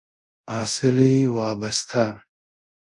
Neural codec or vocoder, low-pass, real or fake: codec, 24 kHz, 0.5 kbps, DualCodec; 10.8 kHz; fake